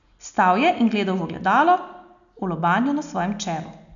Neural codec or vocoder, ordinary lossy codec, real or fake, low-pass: none; none; real; 7.2 kHz